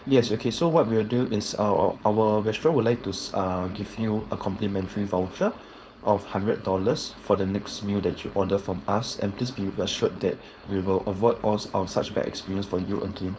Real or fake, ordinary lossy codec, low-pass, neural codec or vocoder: fake; none; none; codec, 16 kHz, 4.8 kbps, FACodec